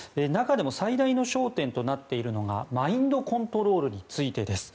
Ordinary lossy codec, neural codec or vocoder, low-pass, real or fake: none; none; none; real